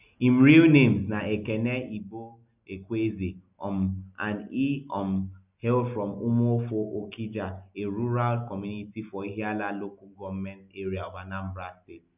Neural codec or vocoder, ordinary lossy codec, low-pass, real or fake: none; none; 3.6 kHz; real